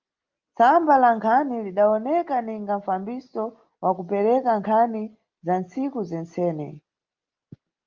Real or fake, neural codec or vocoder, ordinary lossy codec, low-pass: real; none; Opus, 32 kbps; 7.2 kHz